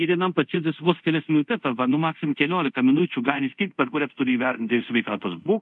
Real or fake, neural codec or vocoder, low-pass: fake; codec, 24 kHz, 0.5 kbps, DualCodec; 10.8 kHz